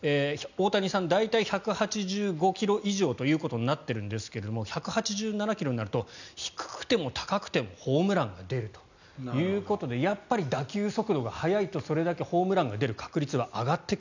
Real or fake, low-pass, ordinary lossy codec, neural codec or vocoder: real; 7.2 kHz; none; none